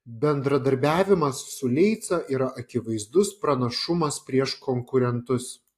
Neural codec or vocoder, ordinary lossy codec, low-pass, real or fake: none; AAC, 64 kbps; 14.4 kHz; real